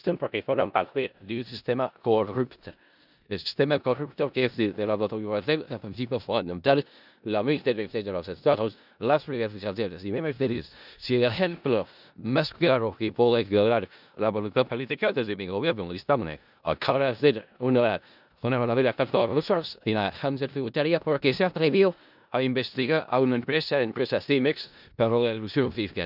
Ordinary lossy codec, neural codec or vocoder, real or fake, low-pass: none; codec, 16 kHz in and 24 kHz out, 0.4 kbps, LongCat-Audio-Codec, four codebook decoder; fake; 5.4 kHz